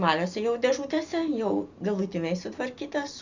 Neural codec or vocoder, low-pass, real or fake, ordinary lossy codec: none; 7.2 kHz; real; Opus, 64 kbps